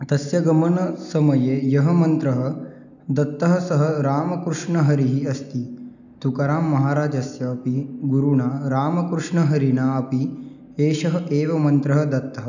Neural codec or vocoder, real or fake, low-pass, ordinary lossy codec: none; real; 7.2 kHz; none